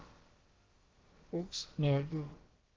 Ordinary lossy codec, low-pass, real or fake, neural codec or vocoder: Opus, 16 kbps; 7.2 kHz; fake; codec, 16 kHz, about 1 kbps, DyCAST, with the encoder's durations